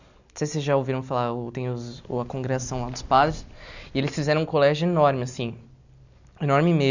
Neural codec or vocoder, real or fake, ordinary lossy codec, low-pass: none; real; none; 7.2 kHz